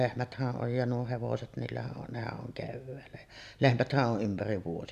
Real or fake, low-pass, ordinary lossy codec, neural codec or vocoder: real; 14.4 kHz; none; none